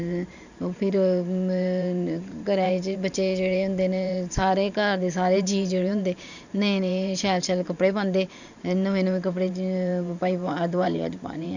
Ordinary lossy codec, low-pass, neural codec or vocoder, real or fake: none; 7.2 kHz; vocoder, 44.1 kHz, 128 mel bands every 512 samples, BigVGAN v2; fake